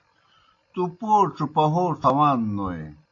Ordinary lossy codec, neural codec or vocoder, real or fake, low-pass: AAC, 48 kbps; none; real; 7.2 kHz